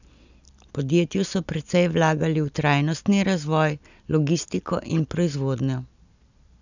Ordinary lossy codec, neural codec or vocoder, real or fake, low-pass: none; none; real; 7.2 kHz